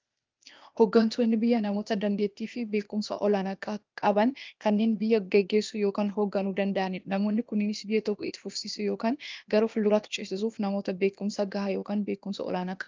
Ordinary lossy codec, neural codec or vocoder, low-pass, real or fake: Opus, 24 kbps; codec, 16 kHz, 0.8 kbps, ZipCodec; 7.2 kHz; fake